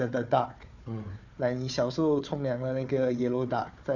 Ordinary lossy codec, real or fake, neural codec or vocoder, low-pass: none; fake; codec, 16 kHz, 4 kbps, FunCodec, trained on Chinese and English, 50 frames a second; 7.2 kHz